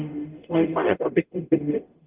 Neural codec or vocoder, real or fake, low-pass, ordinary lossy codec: codec, 44.1 kHz, 0.9 kbps, DAC; fake; 3.6 kHz; Opus, 24 kbps